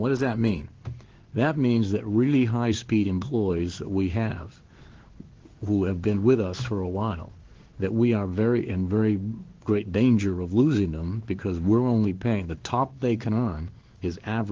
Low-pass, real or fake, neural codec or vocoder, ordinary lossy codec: 7.2 kHz; fake; codec, 16 kHz in and 24 kHz out, 1 kbps, XY-Tokenizer; Opus, 16 kbps